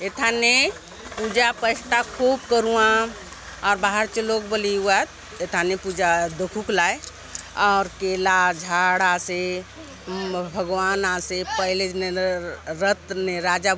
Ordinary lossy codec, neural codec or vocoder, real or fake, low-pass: none; none; real; none